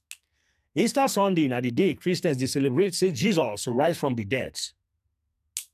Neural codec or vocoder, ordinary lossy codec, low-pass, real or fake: codec, 44.1 kHz, 2.6 kbps, SNAC; none; 14.4 kHz; fake